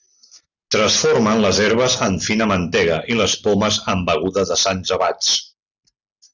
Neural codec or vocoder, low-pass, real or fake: none; 7.2 kHz; real